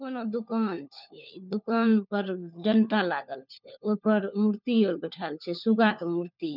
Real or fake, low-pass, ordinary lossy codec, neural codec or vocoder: fake; 5.4 kHz; none; codec, 16 kHz, 4 kbps, FunCodec, trained on LibriTTS, 50 frames a second